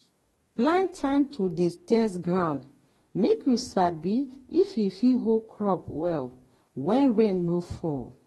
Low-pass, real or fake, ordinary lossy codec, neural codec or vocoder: 19.8 kHz; fake; AAC, 32 kbps; codec, 44.1 kHz, 2.6 kbps, DAC